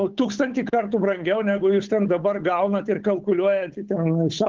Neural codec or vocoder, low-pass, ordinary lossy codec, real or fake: codec, 16 kHz, 8 kbps, FunCodec, trained on Chinese and English, 25 frames a second; 7.2 kHz; Opus, 16 kbps; fake